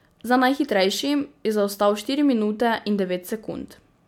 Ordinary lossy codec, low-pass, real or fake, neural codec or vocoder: MP3, 96 kbps; 19.8 kHz; real; none